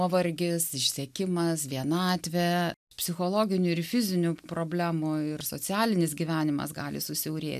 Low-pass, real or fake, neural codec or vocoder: 14.4 kHz; real; none